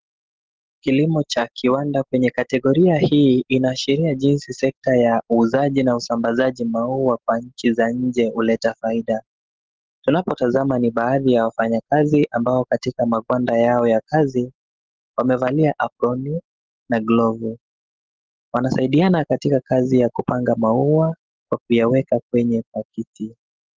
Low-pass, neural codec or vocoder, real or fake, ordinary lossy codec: 7.2 kHz; none; real; Opus, 16 kbps